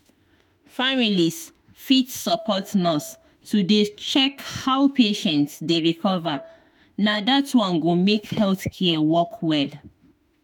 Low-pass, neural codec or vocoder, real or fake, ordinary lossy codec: none; autoencoder, 48 kHz, 32 numbers a frame, DAC-VAE, trained on Japanese speech; fake; none